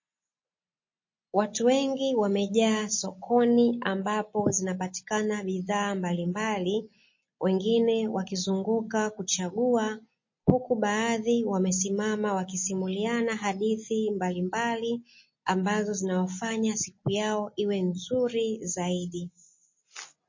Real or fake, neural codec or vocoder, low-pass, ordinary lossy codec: real; none; 7.2 kHz; MP3, 32 kbps